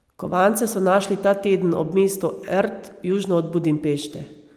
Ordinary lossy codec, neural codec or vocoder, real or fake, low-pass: Opus, 32 kbps; none; real; 14.4 kHz